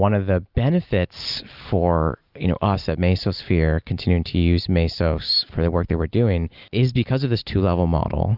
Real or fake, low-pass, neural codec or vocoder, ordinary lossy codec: real; 5.4 kHz; none; Opus, 24 kbps